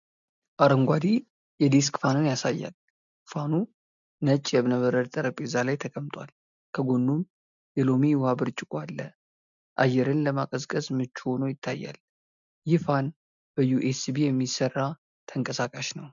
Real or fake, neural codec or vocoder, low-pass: real; none; 7.2 kHz